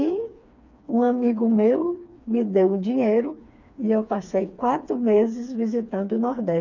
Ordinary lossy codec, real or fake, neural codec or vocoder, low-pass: none; fake; codec, 16 kHz, 4 kbps, FreqCodec, smaller model; 7.2 kHz